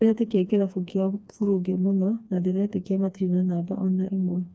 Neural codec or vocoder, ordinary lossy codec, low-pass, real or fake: codec, 16 kHz, 2 kbps, FreqCodec, smaller model; none; none; fake